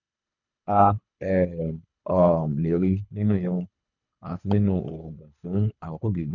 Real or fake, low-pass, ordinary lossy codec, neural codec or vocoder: fake; 7.2 kHz; none; codec, 24 kHz, 3 kbps, HILCodec